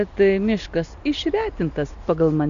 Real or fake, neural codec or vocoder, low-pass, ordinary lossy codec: real; none; 7.2 kHz; MP3, 96 kbps